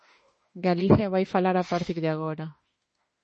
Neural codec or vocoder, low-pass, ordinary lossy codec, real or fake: autoencoder, 48 kHz, 32 numbers a frame, DAC-VAE, trained on Japanese speech; 10.8 kHz; MP3, 32 kbps; fake